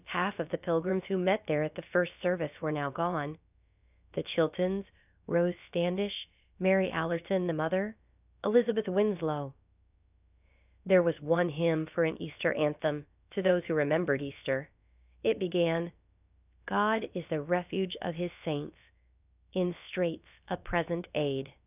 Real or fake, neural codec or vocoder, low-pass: fake; codec, 16 kHz, about 1 kbps, DyCAST, with the encoder's durations; 3.6 kHz